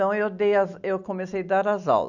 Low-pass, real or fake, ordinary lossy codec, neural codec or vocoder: 7.2 kHz; real; none; none